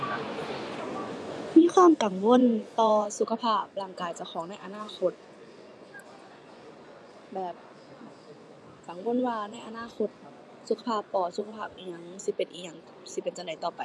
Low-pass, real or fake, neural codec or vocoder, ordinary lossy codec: none; fake; vocoder, 24 kHz, 100 mel bands, Vocos; none